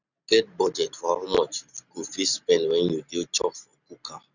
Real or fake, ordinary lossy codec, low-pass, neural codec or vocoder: real; none; 7.2 kHz; none